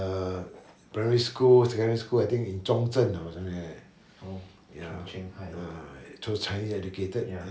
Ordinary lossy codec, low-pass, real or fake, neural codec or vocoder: none; none; real; none